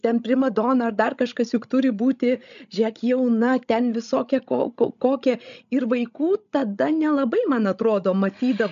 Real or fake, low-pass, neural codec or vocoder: fake; 7.2 kHz; codec, 16 kHz, 16 kbps, FreqCodec, larger model